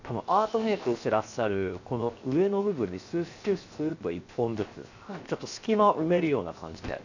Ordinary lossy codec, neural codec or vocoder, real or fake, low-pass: none; codec, 16 kHz, 0.7 kbps, FocalCodec; fake; 7.2 kHz